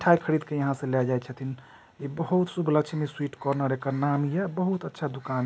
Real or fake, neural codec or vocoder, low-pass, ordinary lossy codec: real; none; none; none